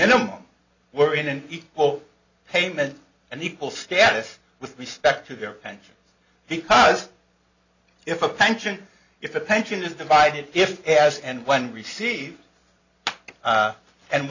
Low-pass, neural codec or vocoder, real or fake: 7.2 kHz; none; real